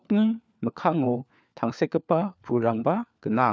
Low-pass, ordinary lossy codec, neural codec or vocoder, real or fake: none; none; codec, 16 kHz, 4 kbps, FunCodec, trained on LibriTTS, 50 frames a second; fake